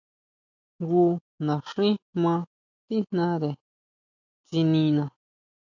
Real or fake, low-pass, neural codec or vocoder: real; 7.2 kHz; none